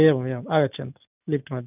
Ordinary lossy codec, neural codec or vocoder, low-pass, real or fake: none; none; 3.6 kHz; real